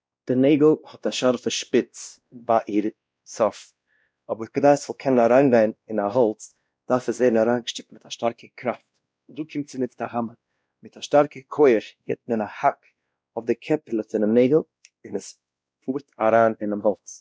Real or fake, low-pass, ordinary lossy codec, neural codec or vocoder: fake; none; none; codec, 16 kHz, 1 kbps, X-Codec, WavLM features, trained on Multilingual LibriSpeech